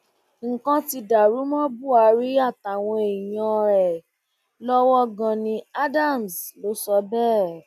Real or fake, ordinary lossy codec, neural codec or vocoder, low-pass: real; none; none; 14.4 kHz